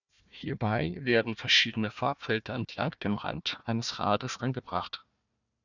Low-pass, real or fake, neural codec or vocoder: 7.2 kHz; fake; codec, 16 kHz, 1 kbps, FunCodec, trained on Chinese and English, 50 frames a second